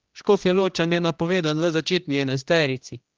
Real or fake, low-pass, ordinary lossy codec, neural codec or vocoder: fake; 7.2 kHz; Opus, 24 kbps; codec, 16 kHz, 1 kbps, X-Codec, HuBERT features, trained on general audio